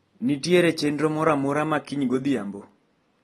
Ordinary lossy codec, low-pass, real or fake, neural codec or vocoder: AAC, 32 kbps; 19.8 kHz; real; none